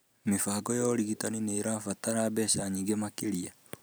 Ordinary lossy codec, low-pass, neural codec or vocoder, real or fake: none; none; none; real